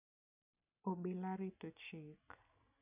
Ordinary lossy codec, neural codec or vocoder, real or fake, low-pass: none; none; real; 3.6 kHz